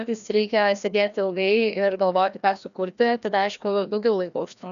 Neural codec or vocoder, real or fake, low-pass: codec, 16 kHz, 1 kbps, FreqCodec, larger model; fake; 7.2 kHz